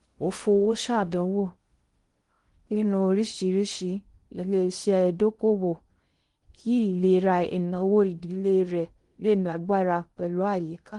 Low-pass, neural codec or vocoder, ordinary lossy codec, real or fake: 10.8 kHz; codec, 16 kHz in and 24 kHz out, 0.6 kbps, FocalCodec, streaming, 2048 codes; Opus, 24 kbps; fake